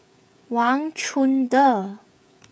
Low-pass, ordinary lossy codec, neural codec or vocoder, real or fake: none; none; codec, 16 kHz, 16 kbps, FreqCodec, smaller model; fake